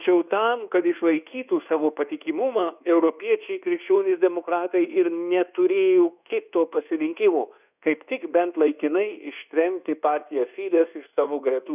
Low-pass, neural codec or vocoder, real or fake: 3.6 kHz; codec, 24 kHz, 1.2 kbps, DualCodec; fake